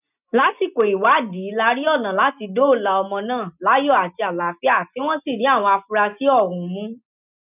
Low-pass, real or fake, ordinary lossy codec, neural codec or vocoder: 3.6 kHz; real; none; none